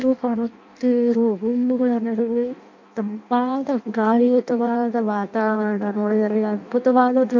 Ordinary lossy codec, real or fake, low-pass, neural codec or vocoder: MP3, 48 kbps; fake; 7.2 kHz; codec, 16 kHz in and 24 kHz out, 0.6 kbps, FireRedTTS-2 codec